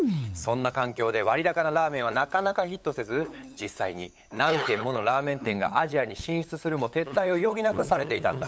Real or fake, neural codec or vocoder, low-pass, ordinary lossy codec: fake; codec, 16 kHz, 8 kbps, FunCodec, trained on LibriTTS, 25 frames a second; none; none